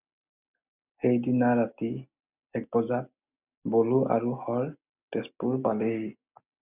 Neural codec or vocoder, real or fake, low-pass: none; real; 3.6 kHz